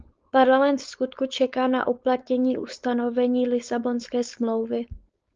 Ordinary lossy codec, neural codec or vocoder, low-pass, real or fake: Opus, 24 kbps; codec, 16 kHz, 4.8 kbps, FACodec; 7.2 kHz; fake